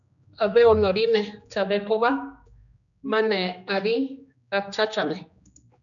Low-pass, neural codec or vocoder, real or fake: 7.2 kHz; codec, 16 kHz, 2 kbps, X-Codec, HuBERT features, trained on general audio; fake